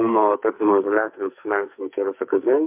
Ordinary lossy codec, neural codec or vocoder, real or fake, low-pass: MP3, 32 kbps; codec, 44.1 kHz, 2.6 kbps, SNAC; fake; 3.6 kHz